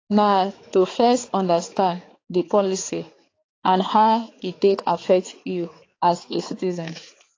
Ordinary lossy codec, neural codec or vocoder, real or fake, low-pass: AAC, 32 kbps; codec, 16 kHz, 4 kbps, X-Codec, HuBERT features, trained on balanced general audio; fake; 7.2 kHz